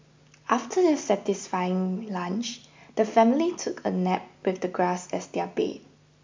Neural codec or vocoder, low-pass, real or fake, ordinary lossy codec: none; 7.2 kHz; real; MP3, 64 kbps